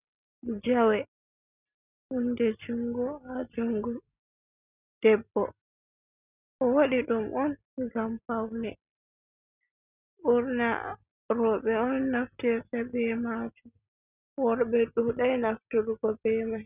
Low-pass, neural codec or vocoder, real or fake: 3.6 kHz; none; real